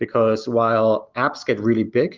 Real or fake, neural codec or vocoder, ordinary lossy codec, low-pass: real; none; Opus, 32 kbps; 7.2 kHz